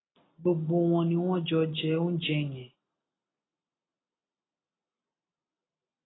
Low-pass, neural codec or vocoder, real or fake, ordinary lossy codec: 7.2 kHz; none; real; AAC, 16 kbps